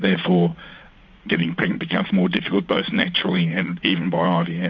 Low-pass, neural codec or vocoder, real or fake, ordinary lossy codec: 7.2 kHz; vocoder, 44.1 kHz, 80 mel bands, Vocos; fake; MP3, 48 kbps